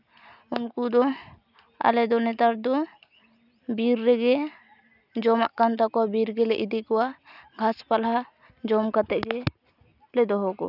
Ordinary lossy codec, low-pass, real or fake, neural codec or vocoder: none; 5.4 kHz; real; none